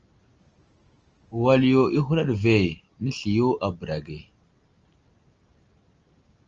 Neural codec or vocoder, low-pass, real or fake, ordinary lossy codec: none; 7.2 kHz; real; Opus, 24 kbps